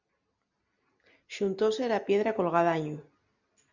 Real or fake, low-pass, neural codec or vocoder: real; 7.2 kHz; none